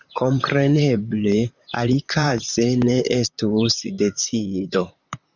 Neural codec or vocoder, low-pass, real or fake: vocoder, 44.1 kHz, 128 mel bands, Pupu-Vocoder; 7.2 kHz; fake